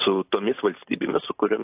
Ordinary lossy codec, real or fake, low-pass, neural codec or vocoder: AAC, 32 kbps; fake; 3.6 kHz; vocoder, 44.1 kHz, 128 mel bands every 512 samples, BigVGAN v2